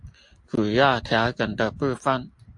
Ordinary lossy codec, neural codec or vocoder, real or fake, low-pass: AAC, 64 kbps; none; real; 10.8 kHz